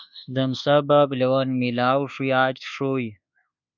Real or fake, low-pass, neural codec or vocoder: fake; 7.2 kHz; codec, 24 kHz, 1.2 kbps, DualCodec